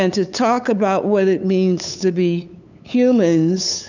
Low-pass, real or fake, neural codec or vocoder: 7.2 kHz; fake; codec, 16 kHz, 8 kbps, FunCodec, trained on LibriTTS, 25 frames a second